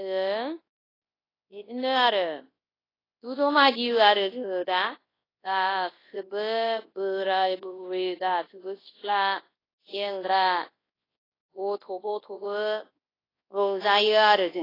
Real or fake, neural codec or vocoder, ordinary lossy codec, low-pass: fake; codec, 24 kHz, 0.9 kbps, WavTokenizer, large speech release; AAC, 24 kbps; 5.4 kHz